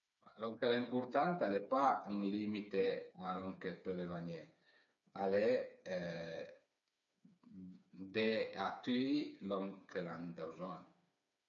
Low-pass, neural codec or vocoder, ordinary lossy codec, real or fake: 7.2 kHz; codec, 16 kHz, 4 kbps, FreqCodec, smaller model; MP3, 64 kbps; fake